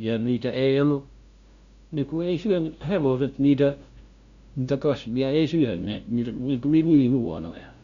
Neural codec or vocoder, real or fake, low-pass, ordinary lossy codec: codec, 16 kHz, 0.5 kbps, FunCodec, trained on LibriTTS, 25 frames a second; fake; 7.2 kHz; Opus, 64 kbps